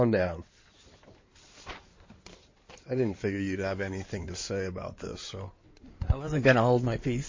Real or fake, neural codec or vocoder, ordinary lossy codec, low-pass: fake; codec, 16 kHz in and 24 kHz out, 2.2 kbps, FireRedTTS-2 codec; MP3, 32 kbps; 7.2 kHz